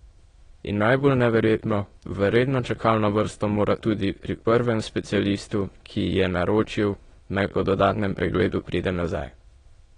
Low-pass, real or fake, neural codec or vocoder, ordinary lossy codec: 9.9 kHz; fake; autoencoder, 22.05 kHz, a latent of 192 numbers a frame, VITS, trained on many speakers; AAC, 32 kbps